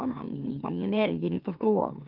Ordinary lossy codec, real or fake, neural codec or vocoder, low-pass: Opus, 24 kbps; fake; autoencoder, 44.1 kHz, a latent of 192 numbers a frame, MeloTTS; 5.4 kHz